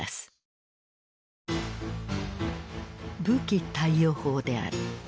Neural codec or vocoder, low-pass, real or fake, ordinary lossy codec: none; none; real; none